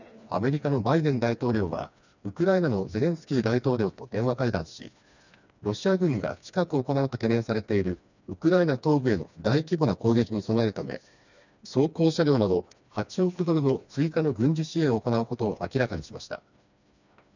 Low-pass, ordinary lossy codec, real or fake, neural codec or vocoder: 7.2 kHz; none; fake; codec, 16 kHz, 2 kbps, FreqCodec, smaller model